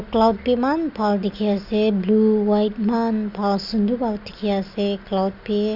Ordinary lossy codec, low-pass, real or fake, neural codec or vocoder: Opus, 64 kbps; 5.4 kHz; real; none